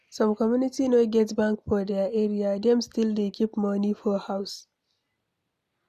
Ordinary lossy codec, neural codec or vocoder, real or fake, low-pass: none; none; real; 14.4 kHz